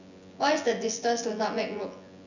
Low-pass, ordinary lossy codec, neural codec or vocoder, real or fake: 7.2 kHz; none; vocoder, 24 kHz, 100 mel bands, Vocos; fake